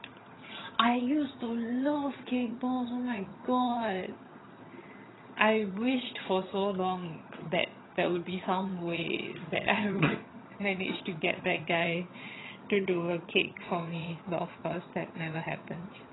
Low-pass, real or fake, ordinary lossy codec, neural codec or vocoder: 7.2 kHz; fake; AAC, 16 kbps; vocoder, 22.05 kHz, 80 mel bands, HiFi-GAN